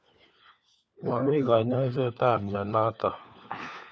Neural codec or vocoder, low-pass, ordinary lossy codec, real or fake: codec, 16 kHz, 4 kbps, FunCodec, trained on LibriTTS, 50 frames a second; none; none; fake